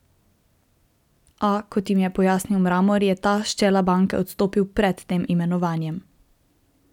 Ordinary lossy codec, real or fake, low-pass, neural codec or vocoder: none; real; 19.8 kHz; none